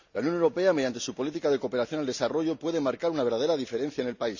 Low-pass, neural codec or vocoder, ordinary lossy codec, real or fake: 7.2 kHz; none; none; real